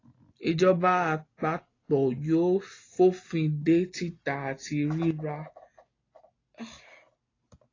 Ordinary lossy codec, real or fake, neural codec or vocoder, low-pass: AAC, 32 kbps; real; none; 7.2 kHz